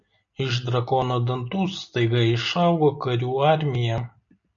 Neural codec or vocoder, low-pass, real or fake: none; 7.2 kHz; real